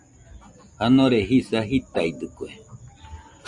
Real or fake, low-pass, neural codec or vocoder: real; 10.8 kHz; none